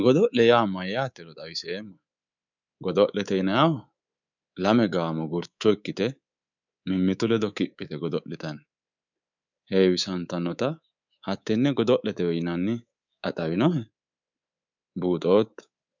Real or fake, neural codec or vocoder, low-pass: fake; codec, 24 kHz, 3.1 kbps, DualCodec; 7.2 kHz